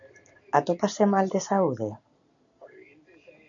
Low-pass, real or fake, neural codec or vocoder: 7.2 kHz; real; none